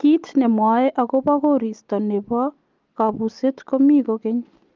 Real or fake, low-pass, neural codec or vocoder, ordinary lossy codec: real; 7.2 kHz; none; Opus, 24 kbps